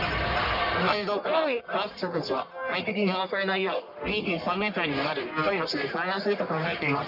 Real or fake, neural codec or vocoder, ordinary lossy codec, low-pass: fake; codec, 44.1 kHz, 1.7 kbps, Pupu-Codec; none; 5.4 kHz